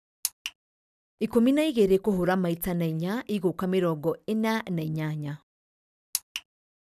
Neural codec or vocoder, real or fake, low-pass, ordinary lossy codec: none; real; 14.4 kHz; none